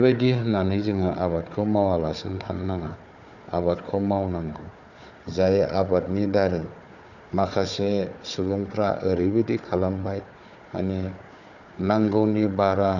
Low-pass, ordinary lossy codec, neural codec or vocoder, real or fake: 7.2 kHz; none; codec, 16 kHz, 4 kbps, FunCodec, trained on Chinese and English, 50 frames a second; fake